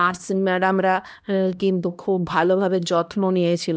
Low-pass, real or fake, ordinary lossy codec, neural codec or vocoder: none; fake; none; codec, 16 kHz, 1 kbps, X-Codec, HuBERT features, trained on LibriSpeech